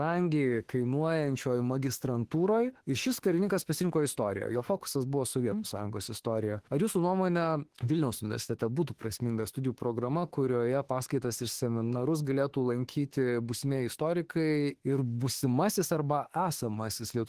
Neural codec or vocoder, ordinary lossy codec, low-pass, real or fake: autoencoder, 48 kHz, 32 numbers a frame, DAC-VAE, trained on Japanese speech; Opus, 16 kbps; 14.4 kHz; fake